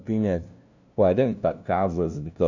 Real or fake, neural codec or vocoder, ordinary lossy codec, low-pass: fake; codec, 16 kHz, 0.5 kbps, FunCodec, trained on LibriTTS, 25 frames a second; none; 7.2 kHz